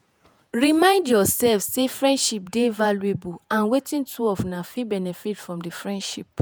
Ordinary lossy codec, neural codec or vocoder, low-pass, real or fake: none; vocoder, 48 kHz, 128 mel bands, Vocos; none; fake